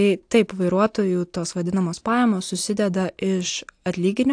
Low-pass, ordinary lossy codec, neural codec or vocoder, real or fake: 9.9 kHz; AAC, 64 kbps; none; real